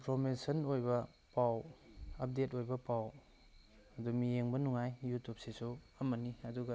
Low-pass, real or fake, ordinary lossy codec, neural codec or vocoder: none; real; none; none